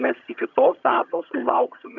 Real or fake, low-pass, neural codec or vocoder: fake; 7.2 kHz; vocoder, 22.05 kHz, 80 mel bands, HiFi-GAN